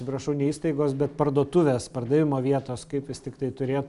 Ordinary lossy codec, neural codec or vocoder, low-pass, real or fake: MP3, 96 kbps; none; 10.8 kHz; real